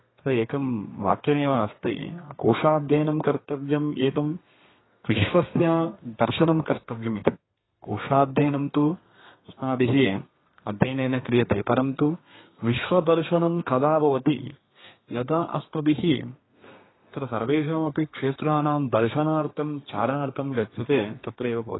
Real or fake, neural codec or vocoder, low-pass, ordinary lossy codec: fake; codec, 32 kHz, 1.9 kbps, SNAC; 7.2 kHz; AAC, 16 kbps